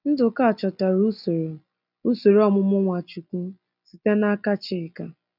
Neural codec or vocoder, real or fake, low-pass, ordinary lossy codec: none; real; 5.4 kHz; none